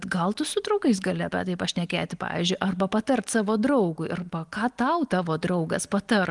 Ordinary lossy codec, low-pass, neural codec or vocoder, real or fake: Opus, 24 kbps; 10.8 kHz; none; real